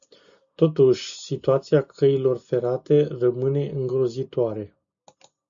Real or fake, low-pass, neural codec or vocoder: real; 7.2 kHz; none